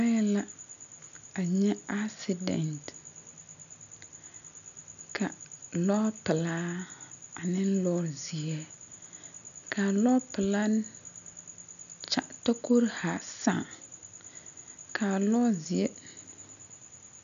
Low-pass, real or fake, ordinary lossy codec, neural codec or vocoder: 7.2 kHz; real; MP3, 96 kbps; none